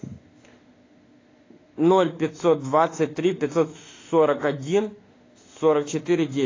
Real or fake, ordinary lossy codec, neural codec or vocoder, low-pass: fake; AAC, 32 kbps; autoencoder, 48 kHz, 32 numbers a frame, DAC-VAE, trained on Japanese speech; 7.2 kHz